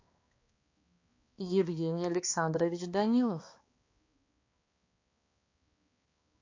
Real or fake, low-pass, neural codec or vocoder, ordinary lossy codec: fake; 7.2 kHz; codec, 16 kHz, 2 kbps, X-Codec, HuBERT features, trained on balanced general audio; AAC, 48 kbps